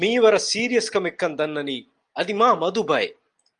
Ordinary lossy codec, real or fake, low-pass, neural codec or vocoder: Opus, 32 kbps; real; 9.9 kHz; none